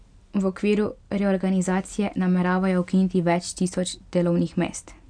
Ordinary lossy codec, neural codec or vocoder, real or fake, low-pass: none; none; real; 9.9 kHz